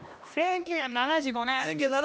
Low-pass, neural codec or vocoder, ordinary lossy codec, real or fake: none; codec, 16 kHz, 1 kbps, X-Codec, HuBERT features, trained on LibriSpeech; none; fake